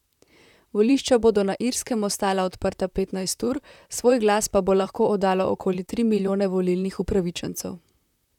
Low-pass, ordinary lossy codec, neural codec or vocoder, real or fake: 19.8 kHz; none; vocoder, 44.1 kHz, 128 mel bands, Pupu-Vocoder; fake